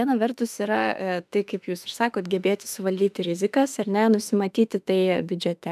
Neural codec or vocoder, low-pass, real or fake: autoencoder, 48 kHz, 32 numbers a frame, DAC-VAE, trained on Japanese speech; 14.4 kHz; fake